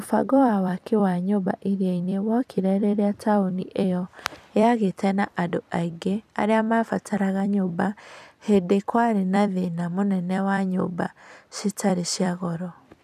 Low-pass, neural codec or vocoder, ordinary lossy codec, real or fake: 14.4 kHz; vocoder, 44.1 kHz, 128 mel bands every 256 samples, BigVGAN v2; none; fake